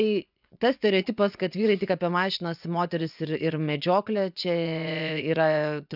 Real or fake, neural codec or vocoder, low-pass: fake; vocoder, 24 kHz, 100 mel bands, Vocos; 5.4 kHz